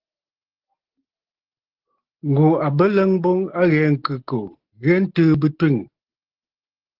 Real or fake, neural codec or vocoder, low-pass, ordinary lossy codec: real; none; 5.4 kHz; Opus, 16 kbps